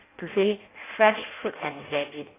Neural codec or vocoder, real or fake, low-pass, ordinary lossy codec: codec, 16 kHz in and 24 kHz out, 0.6 kbps, FireRedTTS-2 codec; fake; 3.6 kHz; AAC, 16 kbps